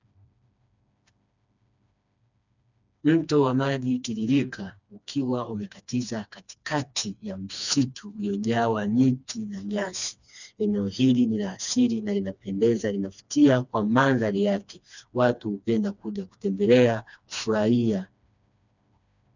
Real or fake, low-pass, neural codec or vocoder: fake; 7.2 kHz; codec, 16 kHz, 2 kbps, FreqCodec, smaller model